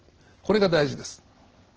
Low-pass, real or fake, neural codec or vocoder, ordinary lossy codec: 7.2 kHz; real; none; Opus, 16 kbps